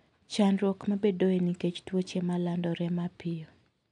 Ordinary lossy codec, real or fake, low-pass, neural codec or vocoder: none; real; 10.8 kHz; none